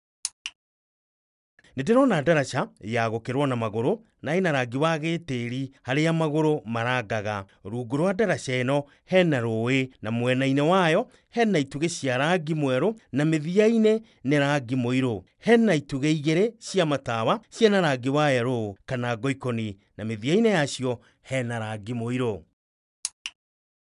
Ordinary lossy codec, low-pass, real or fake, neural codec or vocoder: none; 10.8 kHz; real; none